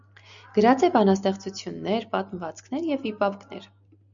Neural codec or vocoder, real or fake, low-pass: none; real; 7.2 kHz